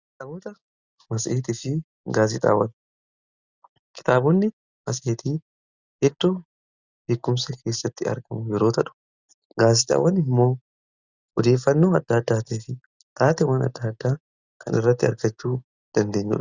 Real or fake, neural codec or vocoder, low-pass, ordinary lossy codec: real; none; 7.2 kHz; Opus, 64 kbps